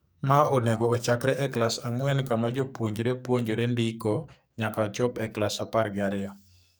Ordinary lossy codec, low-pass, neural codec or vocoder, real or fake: none; none; codec, 44.1 kHz, 2.6 kbps, SNAC; fake